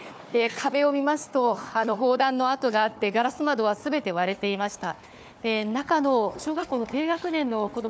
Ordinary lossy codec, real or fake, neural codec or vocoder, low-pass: none; fake; codec, 16 kHz, 4 kbps, FunCodec, trained on Chinese and English, 50 frames a second; none